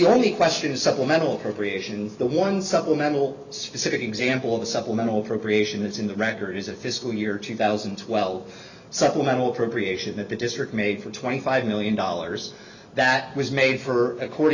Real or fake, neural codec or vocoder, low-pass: real; none; 7.2 kHz